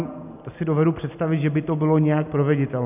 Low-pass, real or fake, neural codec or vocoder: 3.6 kHz; real; none